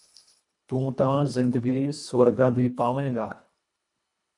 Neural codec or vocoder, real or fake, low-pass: codec, 24 kHz, 1.5 kbps, HILCodec; fake; 10.8 kHz